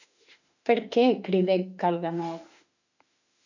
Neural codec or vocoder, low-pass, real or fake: autoencoder, 48 kHz, 32 numbers a frame, DAC-VAE, trained on Japanese speech; 7.2 kHz; fake